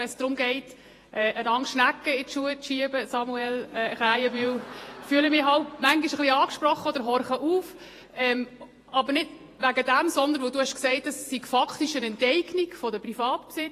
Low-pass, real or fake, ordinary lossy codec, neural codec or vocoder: 14.4 kHz; fake; AAC, 48 kbps; vocoder, 48 kHz, 128 mel bands, Vocos